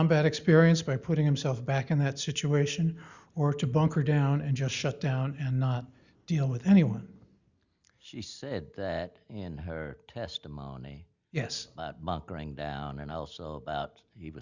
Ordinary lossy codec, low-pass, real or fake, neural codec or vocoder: Opus, 64 kbps; 7.2 kHz; real; none